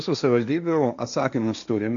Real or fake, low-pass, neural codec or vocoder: fake; 7.2 kHz; codec, 16 kHz, 1.1 kbps, Voila-Tokenizer